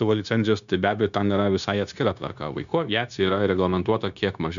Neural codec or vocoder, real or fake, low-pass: codec, 16 kHz, 0.9 kbps, LongCat-Audio-Codec; fake; 7.2 kHz